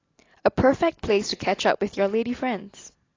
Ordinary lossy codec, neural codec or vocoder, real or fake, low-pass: AAC, 32 kbps; none; real; 7.2 kHz